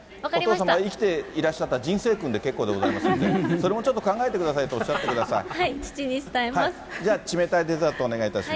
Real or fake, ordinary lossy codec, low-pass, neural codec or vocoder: real; none; none; none